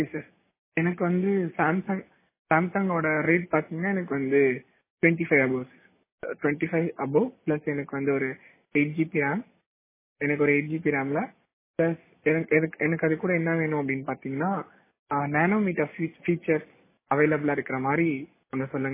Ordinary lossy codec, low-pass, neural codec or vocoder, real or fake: MP3, 16 kbps; 3.6 kHz; none; real